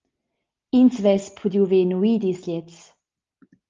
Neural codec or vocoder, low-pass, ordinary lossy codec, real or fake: none; 7.2 kHz; Opus, 32 kbps; real